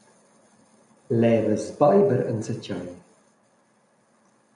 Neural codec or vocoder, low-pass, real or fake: none; 10.8 kHz; real